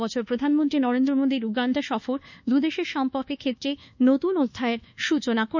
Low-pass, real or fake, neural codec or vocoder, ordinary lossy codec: 7.2 kHz; fake; codec, 24 kHz, 1.2 kbps, DualCodec; none